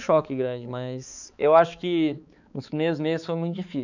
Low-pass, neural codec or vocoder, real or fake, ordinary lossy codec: 7.2 kHz; codec, 16 kHz, 4 kbps, X-Codec, HuBERT features, trained on balanced general audio; fake; none